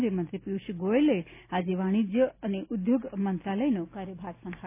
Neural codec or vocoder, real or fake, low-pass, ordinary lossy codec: none; real; 3.6 kHz; none